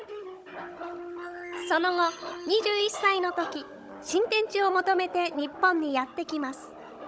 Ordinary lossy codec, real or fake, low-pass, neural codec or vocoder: none; fake; none; codec, 16 kHz, 16 kbps, FunCodec, trained on Chinese and English, 50 frames a second